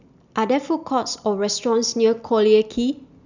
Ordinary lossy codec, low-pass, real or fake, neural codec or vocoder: none; 7.2 kHz; real; none